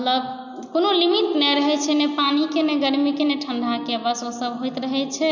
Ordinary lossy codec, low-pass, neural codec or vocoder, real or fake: none; 7.2 kHz; none; real